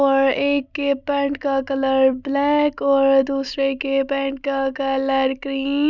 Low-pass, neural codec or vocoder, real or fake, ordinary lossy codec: 7.2 kHz; none; real; none